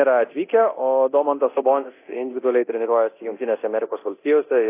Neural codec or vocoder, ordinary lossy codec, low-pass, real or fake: codec, 24 kHz, 0.9 kbps, DualCodec; AAC, 24 kbps; 3.6 kHz; fake